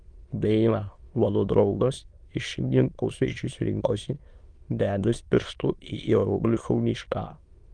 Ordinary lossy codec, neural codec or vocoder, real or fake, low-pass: Opus, 24 kbps; autoencoder, 22.05 kHz, a latent of 192 numbers a frame, VITS, trained on many speakers; fake; 9.9 kHz